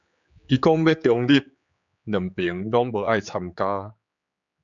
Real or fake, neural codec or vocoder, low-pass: fake; codec, 16 kHz, 4 kbps, X-Codec, HuBERT features, trained on general audio; 7.2 kHz